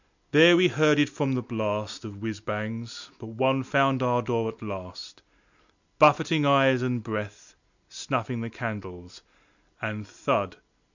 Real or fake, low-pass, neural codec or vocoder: real; 7.2 kHz; none